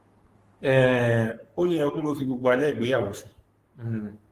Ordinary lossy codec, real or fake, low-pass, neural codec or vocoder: Opus, 24 kbps; fake; 14.4 kHz; codec, 44.1 kHz, 3.4 kbps, Pupu-Codec